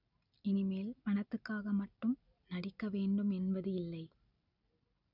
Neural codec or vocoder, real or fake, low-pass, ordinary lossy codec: none; real; 5.4 kHz; none